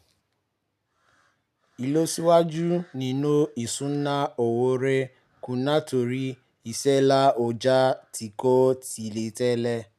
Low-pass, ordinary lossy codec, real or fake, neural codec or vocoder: 14.4 kHz; none; real; none